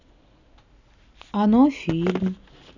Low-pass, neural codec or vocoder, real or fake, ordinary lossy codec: 7.2 kHz; none; real; none